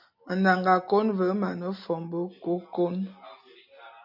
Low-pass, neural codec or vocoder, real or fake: 5.4 kHz; none; real